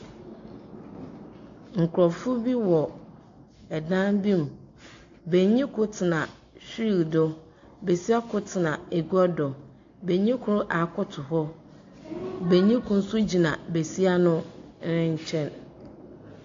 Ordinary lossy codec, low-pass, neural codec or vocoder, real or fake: AAC, 48 kbps; 7.2 kHz; none; real